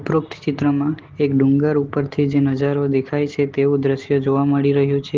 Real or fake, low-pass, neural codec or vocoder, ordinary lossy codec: real; 7.2 kHz; none; Opus, 16 kbps